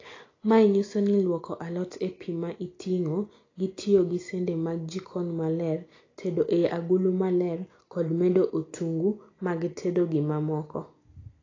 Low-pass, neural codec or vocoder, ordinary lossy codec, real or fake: 7.2 kHz; none; AAC, 32 kbps; real